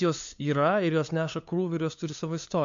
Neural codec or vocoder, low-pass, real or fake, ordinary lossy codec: codec, 16 kHz, 2 kbps, FunCodec, trained on LibriTTS, 25 frames a second; 7.2 kHz; fake; MP3, 64 kbps